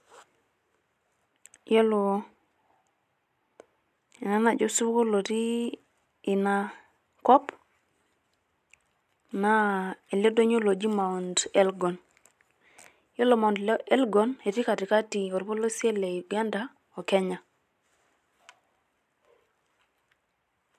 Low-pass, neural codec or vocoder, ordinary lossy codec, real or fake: 14.4 kHz; none; none; real